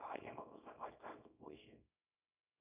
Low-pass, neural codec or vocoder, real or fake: 3.6 kHz; codec, 24 kHz, 0.9 kbps, WavTokenizer, small release; fake